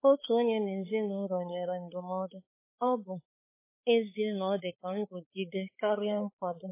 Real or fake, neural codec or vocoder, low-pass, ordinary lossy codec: fake; codec, 16 kHz, 4 kbps, X-Codec, HuBERT features, trained on balanced general audio; 3.6 kHz; MP3, 16 kbps